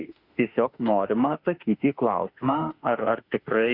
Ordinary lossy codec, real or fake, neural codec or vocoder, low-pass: Opus, 64 kbps; fake; autoencoder, 48 kHz, 32 numbers a frame, DAC-VAE, trained on Japanese speech; 5.4 kHz